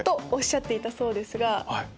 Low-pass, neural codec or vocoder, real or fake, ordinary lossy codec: none; none; real; none